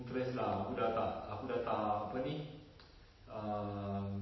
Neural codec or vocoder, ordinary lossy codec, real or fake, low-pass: none; MP3, 24 kbps; real; 7.2 kHz